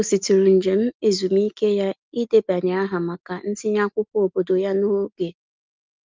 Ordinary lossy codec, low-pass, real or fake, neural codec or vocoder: Opus, 32 kbps; 7.2 kHz; real; none